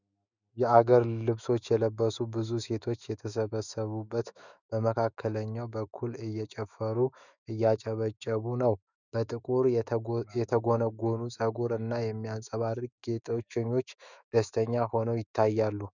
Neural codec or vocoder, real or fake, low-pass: autoencoder, 48 kHz, 128 numbers a frame, DAC-VAE, trained on Japanese speech; fake; 7.2 kHz